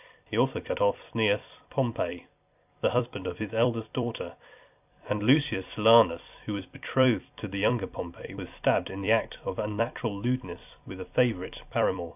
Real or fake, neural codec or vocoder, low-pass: fake; vocoder, 44.1 kHz, 128 mel bands every 256 samples, BigVGAN v2; 3.6 kHz